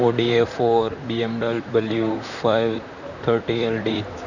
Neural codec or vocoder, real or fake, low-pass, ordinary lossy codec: vocoder, 44.1 kHz, 128 mel bands, Pupu-Vocoder; fake; 7.2 kHz; none